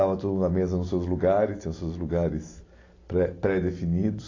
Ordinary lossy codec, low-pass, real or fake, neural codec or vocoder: AAC, 32 kbps; 7.2 kHz; real; none